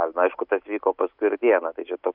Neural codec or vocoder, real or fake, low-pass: none; real; 5.4 kHz